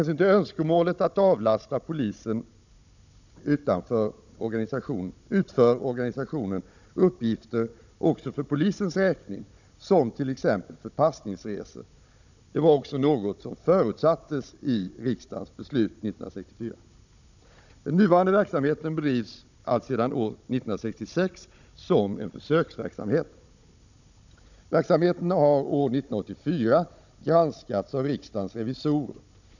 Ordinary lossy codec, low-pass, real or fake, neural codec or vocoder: none; 7.2 kHz; fake; codec, 16 kHz, 16 kbps, FunCodec, trained on Chinese and English, 50 frames a second